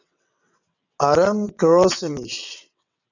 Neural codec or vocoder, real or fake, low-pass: vocoder, 22.05 kHz, 80 mel bands, WaveNeXt; fake; 7.2 kHz